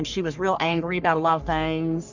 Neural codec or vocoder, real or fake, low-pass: codec, 44.1 kHz, 3.4 kbps, Pupu-Codec; fake; 7.2 kHz